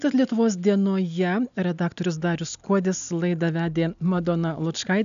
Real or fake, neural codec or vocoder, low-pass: real; none; 7.2 kHz